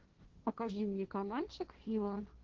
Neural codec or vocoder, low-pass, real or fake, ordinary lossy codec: codec, 16 kHz, 1.1 kbps, Voila-Tokenizer; 7.2 kHz; fake; Opus, 16 kbps